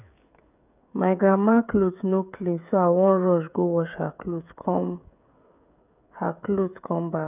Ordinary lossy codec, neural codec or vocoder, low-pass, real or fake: none; codec, 16 kHz, 16 kbps, FreqCodec, smaller model; 3.6 kHz; fake